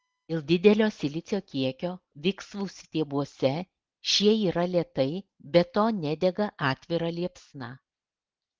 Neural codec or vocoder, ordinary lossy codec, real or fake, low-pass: none; Opus, 16 kbps; real; 7.2 kHz